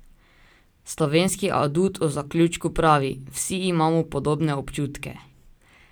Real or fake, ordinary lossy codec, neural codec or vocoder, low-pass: real; none; none; none